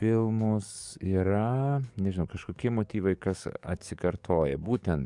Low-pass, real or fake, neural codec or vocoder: 10.8 kHz; fake; codec, 44.1 kHz, 7.8 kbps, DAC